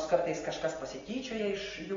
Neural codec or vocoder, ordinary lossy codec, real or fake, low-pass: none; AAC, 24 kbps; real; 7.2 kHz